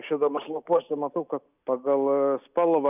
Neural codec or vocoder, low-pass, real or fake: none; 3.6 kHz; real